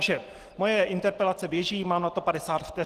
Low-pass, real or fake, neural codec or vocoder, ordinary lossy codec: 14.4 kHz; real; none; Opus, 16 kbps